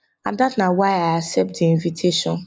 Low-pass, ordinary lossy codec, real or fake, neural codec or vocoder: none; none; real; none